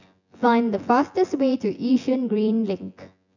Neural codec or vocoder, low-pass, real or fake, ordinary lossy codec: vocoder, 24 kHz, 100 mel bands, Vocos; 7.2 kHz; fake; none